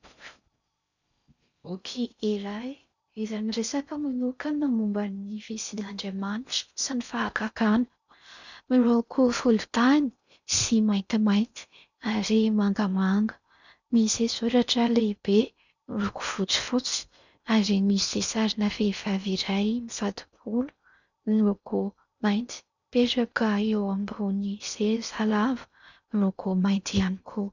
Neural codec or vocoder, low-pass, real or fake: codec, 16 kHz in and 24 kHz out, 0.6 kbps, FocalCodec, streaming, 4096 codes; 7.2 kHz; fake